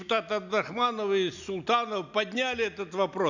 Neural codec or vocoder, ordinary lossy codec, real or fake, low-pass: none; none; real; 7.2 kHz